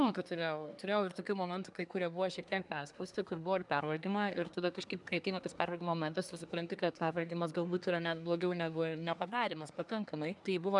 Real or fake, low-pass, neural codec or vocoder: fake; 10.8 kHz; codec, 24 kHz, 1 kbps, SNAC